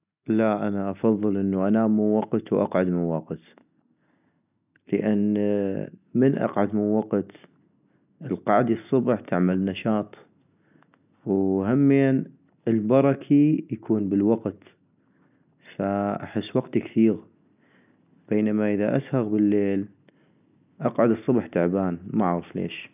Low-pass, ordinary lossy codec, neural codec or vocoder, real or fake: 3.6 kHz; none; none; real